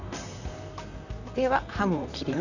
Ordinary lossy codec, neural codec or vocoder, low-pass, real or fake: none; vocoder, 44.1 kHz, 128 mel bands, Pupu-Vocoder; 7.2 kHz; fake